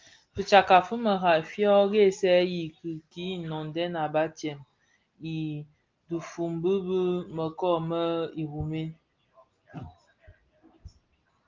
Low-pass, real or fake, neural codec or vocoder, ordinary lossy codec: 7.2 kHz; real; none; Opus, 32 kbps